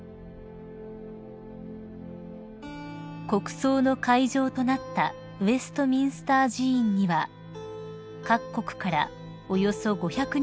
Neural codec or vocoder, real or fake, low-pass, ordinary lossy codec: none; real; none; none